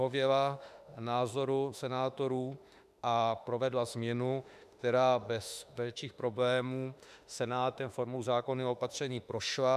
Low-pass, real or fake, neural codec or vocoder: 14.4 kHz; fake; autoencoder, 48 kHz, 32 numbers a frame, DAC-VAE, trained on Japanese speech